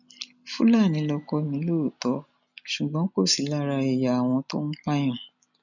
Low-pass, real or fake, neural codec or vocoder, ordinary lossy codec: 7.2 kHz; real; none; none